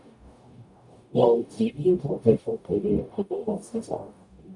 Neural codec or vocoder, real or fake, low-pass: codec, 44.1 kHz, 0.9 kbps, DAC; fake; 10.8 kHz